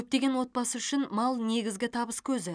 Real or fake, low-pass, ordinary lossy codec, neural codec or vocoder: real; 9.9 kHz; none; none